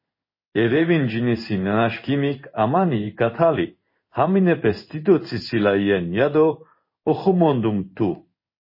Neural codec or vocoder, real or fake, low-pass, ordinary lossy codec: codec, 16 kHz in and 24 kHz out, 1 kbps, XY-Tokenizer; fake; 5.4 kHz; MP3, 24 kbps